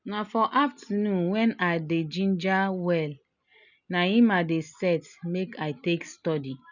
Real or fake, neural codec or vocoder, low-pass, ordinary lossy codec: real; none; 7.2 kHz; none